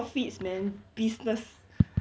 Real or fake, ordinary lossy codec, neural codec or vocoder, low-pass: real; none; none; none